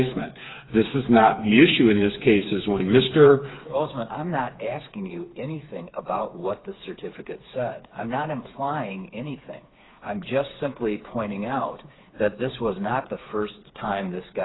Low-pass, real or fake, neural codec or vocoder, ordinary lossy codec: 7.2 kHz; fake; codec, 16 kHz, 4 kbps, FreqCodec, smaller model; AAC, 16 kbps